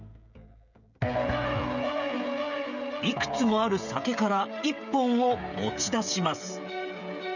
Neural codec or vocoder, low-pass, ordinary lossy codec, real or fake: codec, 16 kHz, 16 kbps, FreqCodec, smaller model; 7.2 kHz; none; fake